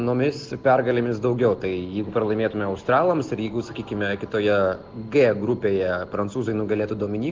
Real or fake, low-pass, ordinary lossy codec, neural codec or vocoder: real; 7.2 kHz; Opus, 16 kbps; none